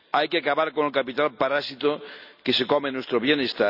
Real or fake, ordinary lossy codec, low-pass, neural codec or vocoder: real; none; 5.4 kHz; none